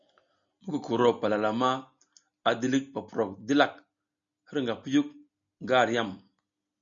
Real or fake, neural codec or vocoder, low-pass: real; none; 7.2 kHz